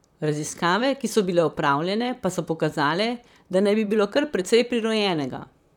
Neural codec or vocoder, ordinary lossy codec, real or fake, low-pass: vocoder, 44.1 kHz, 128 mel bands, Pupu-Vocoder; none; fake; 19.8 kHz